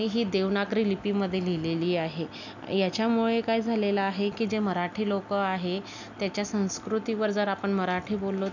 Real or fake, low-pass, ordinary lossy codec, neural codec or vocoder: real; 7.2 kHz; none; none